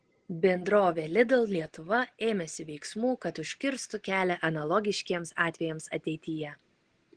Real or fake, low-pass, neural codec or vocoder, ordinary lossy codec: real; 9.9 kHz; none; Opus, 16 kbps